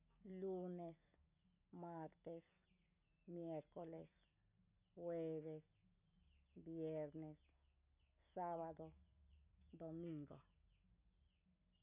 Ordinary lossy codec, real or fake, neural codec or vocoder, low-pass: none; real; none; 3.6 kHz